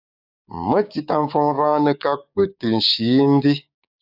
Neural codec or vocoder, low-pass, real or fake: codec, 16 kHz, 6 kbps, DAC; 5.4 kHz; fake